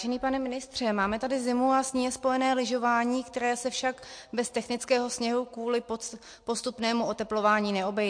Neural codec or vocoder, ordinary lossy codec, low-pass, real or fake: none; MP3, 48 kbps; 9.9 kHz; real